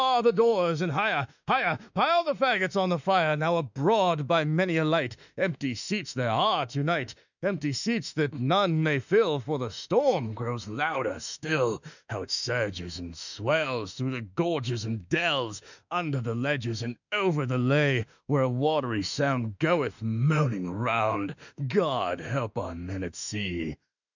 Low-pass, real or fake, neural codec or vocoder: 7.2 kHz; fake; autoencoder, 48 kHz, 32 numbers a frame, DAC-VAE, trained on Japanese speech